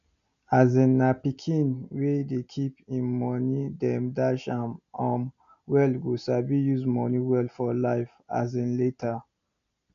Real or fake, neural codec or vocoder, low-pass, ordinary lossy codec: real; none; 7.2 kHz; none